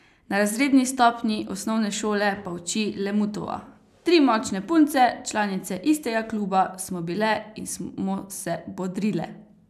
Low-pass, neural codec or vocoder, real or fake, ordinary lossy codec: 14.4 kHz; none; real; none